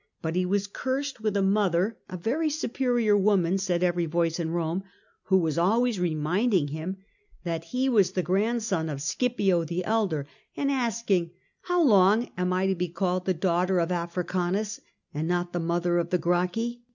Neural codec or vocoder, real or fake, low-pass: none; real; 7.2 kHz